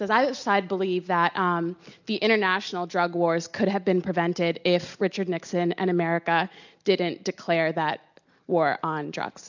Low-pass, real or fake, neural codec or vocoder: 7.2 kHz; real; none